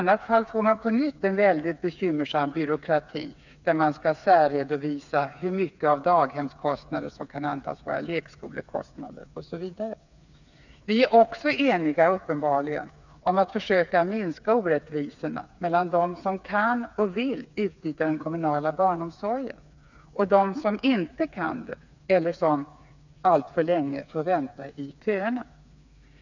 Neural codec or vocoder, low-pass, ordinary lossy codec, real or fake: codec, 16 kHz, 4 kbps, FreqCodec, smaller model; 7.2 kHz; none; fake